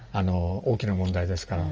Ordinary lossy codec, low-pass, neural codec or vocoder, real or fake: Opus, 24 kbps; 7.2 kHz; none; real